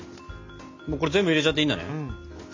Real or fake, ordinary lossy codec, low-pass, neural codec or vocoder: real; MP3, 48 kbps; 7.2 kHz; none